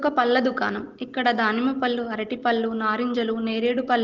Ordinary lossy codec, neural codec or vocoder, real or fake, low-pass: Opus, 16 kbps; none; real; 7.2 kHz